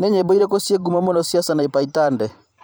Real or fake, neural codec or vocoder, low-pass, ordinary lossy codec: fake; vocoder, 44.1 kHz, 128 mel bands every 512 samples, BigVGAN v2; none; none